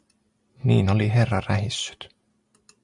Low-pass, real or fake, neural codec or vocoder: 10.8 kHz; real; none